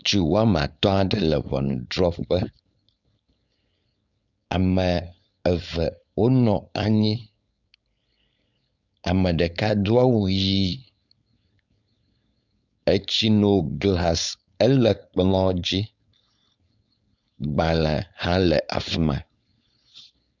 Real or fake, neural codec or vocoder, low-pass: fake; codec, 16 kHz, 4.8 kbps, FACodec; 7.2 kHz